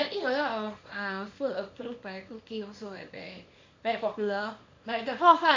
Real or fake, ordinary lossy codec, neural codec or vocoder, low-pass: fake; MP3, 48 kbps; codec, 24 kHz, 0.9 kbps, WavTokenizer, small release; 7.2 kHz